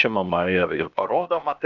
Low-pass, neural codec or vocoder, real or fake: 7.2 kHz; codec, 16 kHz, 0.8 kbps, ZipCodec; fake